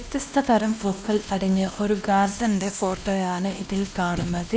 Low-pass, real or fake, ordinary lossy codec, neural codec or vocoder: none; fake; none; codec, 16 kHz, 1 kbps, X-Codec, WavLM features, trained on Multilingual LibriSpeech